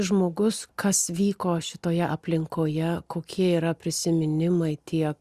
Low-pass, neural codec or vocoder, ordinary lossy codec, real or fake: 14.4 kHz; none; Opus, 64 kbps; real